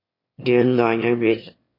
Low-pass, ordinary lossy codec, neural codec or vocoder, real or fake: 5.4 kHz; MP3, 32 kbps; autoencoder, 22.05 kHz, a latent of 192 numbers a frame, VITS, trained on one speaker; fake